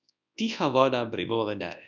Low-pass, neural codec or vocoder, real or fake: 7.2 kHz; codec, 24 kHz, 0.9 kbps, WavTokenizer, large speech release; fake